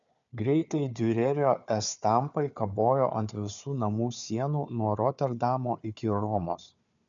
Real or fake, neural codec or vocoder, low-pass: fake; codec, 16 kHz, 4 kbps, FunCodec, trained on Chinese and English, 50 frames a second; 7.2 kHz